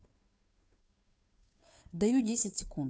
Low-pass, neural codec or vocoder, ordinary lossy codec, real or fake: none; codec, 16 kHz, 2 kbps, FunCodec, trained on Chinese and English, 25 frames a second; none; fake